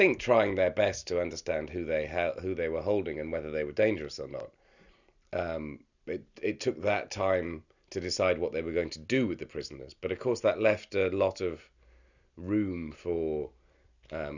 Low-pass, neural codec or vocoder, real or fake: 7.2 kHz; none; real